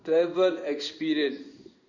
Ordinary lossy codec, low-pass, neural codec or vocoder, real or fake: none; 7.2 kHz; codec, 16 kHz in and 24 kHz out, 1 kbps, XY-Tokenizer; fake